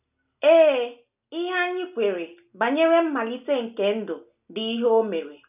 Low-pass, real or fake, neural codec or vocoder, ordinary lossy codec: 3.6 kHz; real; none; none